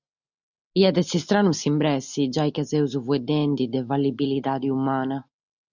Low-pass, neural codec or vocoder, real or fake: 7.2 kHz; none; real